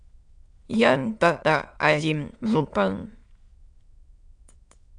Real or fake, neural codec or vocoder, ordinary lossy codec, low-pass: fake; autoencoder, 22.05 kHz, a latent of 192 numbers a frame, VITS, trained on many speakers; AAC, 64 kbps; 9.9 kHz